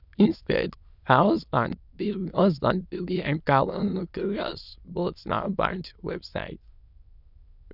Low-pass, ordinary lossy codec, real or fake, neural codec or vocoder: 5.4 kHz; none; fake; autoencoder, 22.05 kHz, a latent of 192 numbers a frame, VITS, trained on many speakers